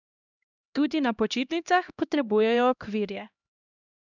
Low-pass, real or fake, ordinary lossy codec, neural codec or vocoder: 7.2 kHz; fake; none; codec, 16 kHz, 1 kbps, X-Codec, HuBERT features, trained on LibriSpeech